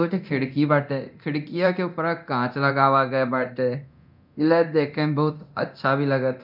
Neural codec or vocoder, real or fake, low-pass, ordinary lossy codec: codec, 24 kHz, 0.9 kbps, DualCodec; fake; 5.4 kHz; none